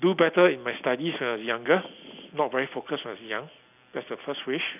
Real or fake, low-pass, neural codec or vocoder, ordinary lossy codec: real; 3.6 kHz; none; none